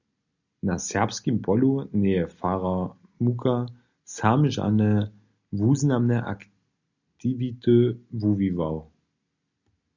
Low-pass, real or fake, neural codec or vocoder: 7.2 kHz; real; none